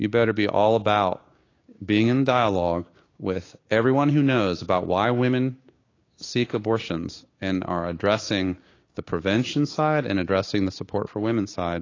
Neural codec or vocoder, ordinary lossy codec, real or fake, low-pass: codec, 16 kHz, 6 kbps, DAC; AAC, 32 kbps; fake; 7.2 kHz